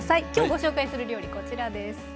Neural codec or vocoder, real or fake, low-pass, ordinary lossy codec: none; real; none; none